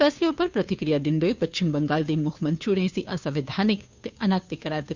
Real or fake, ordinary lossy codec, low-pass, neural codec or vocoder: fake; Opus, 64 kbps; 7.2 kHz; codec, 16 kHz, 2 kbps, FunCodec, trained on Chinese and English, 25 frames a second